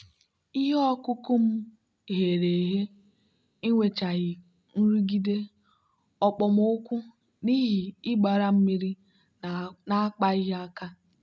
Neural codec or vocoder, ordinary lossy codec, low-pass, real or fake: none; none; none; real